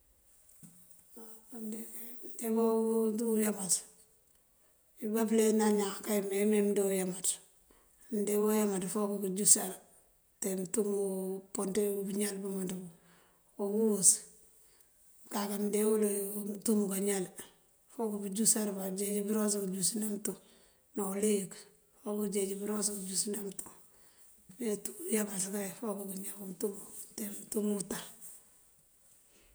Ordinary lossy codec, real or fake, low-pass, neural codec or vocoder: none; fake; none; vocoder, 48 kHz, 128 mel bands, Vocos